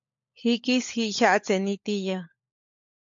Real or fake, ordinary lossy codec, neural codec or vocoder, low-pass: fake; MP3, 48 kbps; codec, 16 kHz, 16 kbps, FunCodec, trained on LibriTTS, 50 frames a second; 7.2 kHz